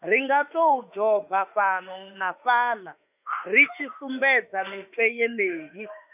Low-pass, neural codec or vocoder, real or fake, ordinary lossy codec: 3.6 kHz; autoencoder, 48 kHz, 32 numbers a frame, DAC-VAE, trained on Japanese speech; fake; none